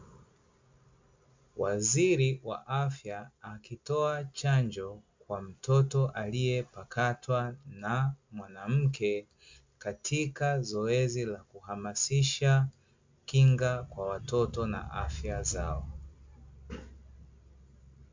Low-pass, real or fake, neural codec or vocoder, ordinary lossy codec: 7.2 kHz; real; none; MP3, 64 kbps